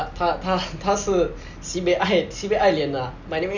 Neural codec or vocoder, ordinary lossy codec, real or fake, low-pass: none; none; real; 7.2 kHz